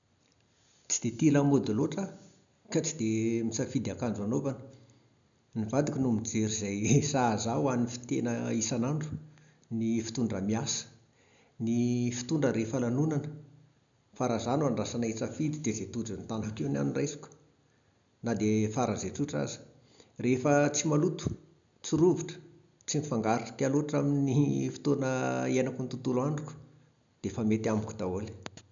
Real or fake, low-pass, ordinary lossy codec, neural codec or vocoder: real; 7.2 kHz; none; none